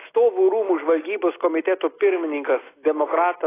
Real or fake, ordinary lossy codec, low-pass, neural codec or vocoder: real; AAC, 16 kbps; 3.6 kHz; none